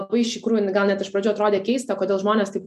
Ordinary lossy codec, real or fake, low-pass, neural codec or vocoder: MP3, 64 kbps; real; 14.4 kHz; none